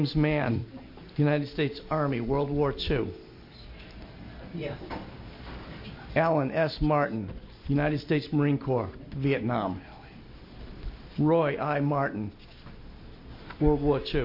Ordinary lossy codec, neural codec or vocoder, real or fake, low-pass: MP3, 32 kbps; none; real; 5.4 kHz